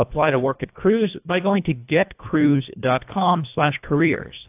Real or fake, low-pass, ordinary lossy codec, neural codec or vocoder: fake; 3.6 kHz; AAC, 32 kbps; codec, 24 kHz, 1.5 kbps, HILCodec